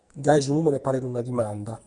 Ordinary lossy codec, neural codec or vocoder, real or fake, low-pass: Opus, 64 kbps; codec, 44.1 kHz, 2.6 kbps, SNAC; fake; 10.8 kHz